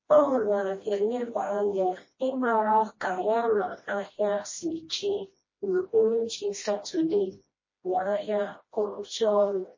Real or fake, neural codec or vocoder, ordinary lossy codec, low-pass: fake; codec, 16 kHz, 1 kbps, FreqCodec, smaller model; MP3, 32 kbps; 7.2 kHz